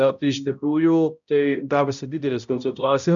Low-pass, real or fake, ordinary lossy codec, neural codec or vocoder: 7.2 kHz; fake; MP3, 96 kbps; codec, 16 kHz, 0.5 kbps, X-Codec, HuBERT features, trained on balanced general audio